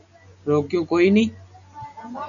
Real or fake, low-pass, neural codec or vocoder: real; 7.2 kHz; none